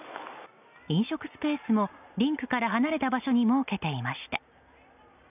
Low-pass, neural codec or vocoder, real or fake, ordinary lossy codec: 3.6 kHz; none; real; none